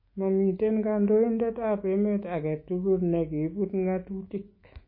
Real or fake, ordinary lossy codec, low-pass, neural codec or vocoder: fake; MP3, 24 kbps; 5.4 kHz; autoencoder, 48 kHz, 128 numbers a frame, DAC-VAE, trained on Japanese speech